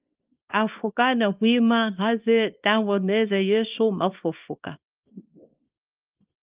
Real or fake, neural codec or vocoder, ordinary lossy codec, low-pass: fake; codec, 24 kHz, 0.9 kbps, WavTokenizer, small release; Opus, 32 kbps; 3.6 kHz